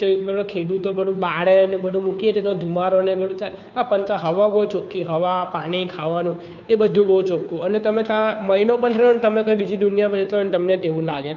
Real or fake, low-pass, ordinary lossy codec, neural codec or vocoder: fake; 7.2 kHz; none; codec, 16 kHz, 2 kbps, FunCodec, trained on Chinese and English, 25 frames a second